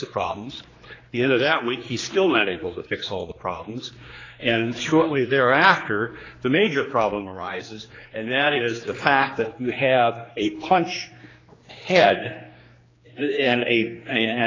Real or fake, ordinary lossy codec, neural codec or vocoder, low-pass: fake; AAC, 32 kbps; codec, 16 kHz, 2 kbps, X-Codec, HuBERT features, trained on general audio; 7.2 kHz